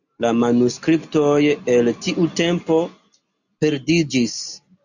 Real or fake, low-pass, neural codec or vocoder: real; 7.2 kHz; none